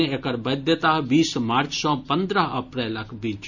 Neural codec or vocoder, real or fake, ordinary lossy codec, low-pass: none; real; none; 7.2 kHz